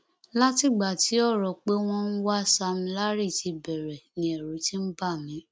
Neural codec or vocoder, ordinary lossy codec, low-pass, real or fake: none; none; none; real